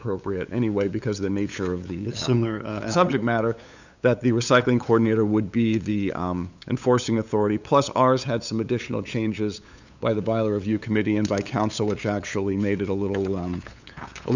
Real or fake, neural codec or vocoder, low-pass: fake; codec, 16 kHz, 8 kbps, FunCodec, trained on LibriTTS, 25 frames a second; 7.2 kHz